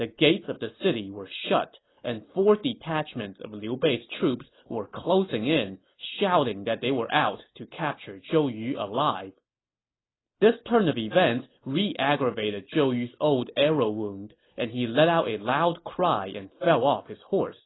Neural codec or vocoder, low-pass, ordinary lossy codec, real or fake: none; 7.2 kHz; AAC, 16 kbps; real